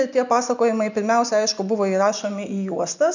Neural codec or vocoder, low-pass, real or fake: none; 7.2 kHz; real